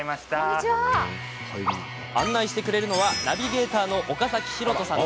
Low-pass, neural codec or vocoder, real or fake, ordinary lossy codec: none; none; real; none